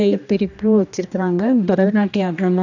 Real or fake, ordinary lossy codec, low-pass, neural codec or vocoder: fake; none; 7.2 kHz; codec, 16 kHz, 1 kbps, X-Codec, HuBERT features, trained on general audio